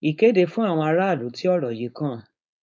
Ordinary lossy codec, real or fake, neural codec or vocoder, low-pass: none; fake; codec, 16 kHz, 4.8 kbps, FACodec; none